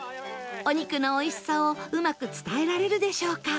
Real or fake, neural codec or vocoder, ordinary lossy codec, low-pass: real; none; none; none